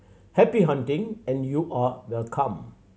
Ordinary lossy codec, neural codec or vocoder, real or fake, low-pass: none; none; real; none